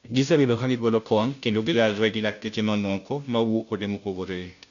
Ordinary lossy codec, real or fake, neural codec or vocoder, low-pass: none; fake; codec, 16 kHz, 0.5 kbps, FunCodec, trained on Chinese and English, 25 frames a second; 7.2 kHz